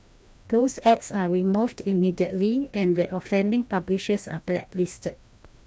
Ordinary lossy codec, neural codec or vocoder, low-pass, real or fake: none; codec, 16 kHz, 1 kbps, FreqCodec, larger model; none; fake